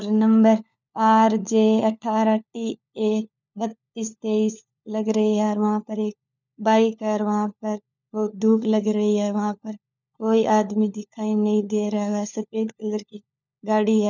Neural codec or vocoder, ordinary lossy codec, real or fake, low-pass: codec, 16 kHz, 4 kbps, FunCodec, trained on LibriTTS, 50 frames a second; none; fake; 7.2 kHz